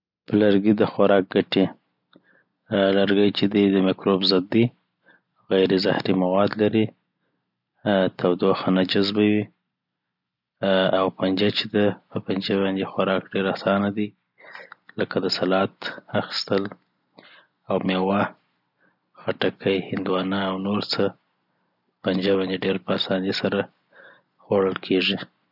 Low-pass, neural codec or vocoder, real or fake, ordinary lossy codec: 5.4 kHz; none; real; none